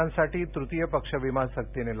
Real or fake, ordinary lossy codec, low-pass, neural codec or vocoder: real; none; 3.6 kHz; none